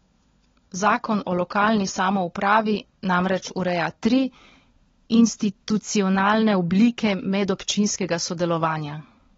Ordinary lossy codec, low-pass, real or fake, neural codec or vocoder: AAC, 24 kbps; 7.2 kHz; fake; codec, 16 kHz, 16 kbps, FunCodec, trained on LibriTTS, 50 frames a second